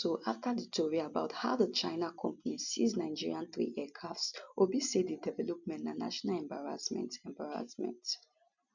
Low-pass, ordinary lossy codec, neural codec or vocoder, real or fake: 7.2 kHz; none; none; real